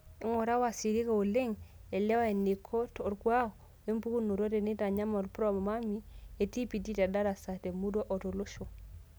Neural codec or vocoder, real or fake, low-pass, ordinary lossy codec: none; real; none; none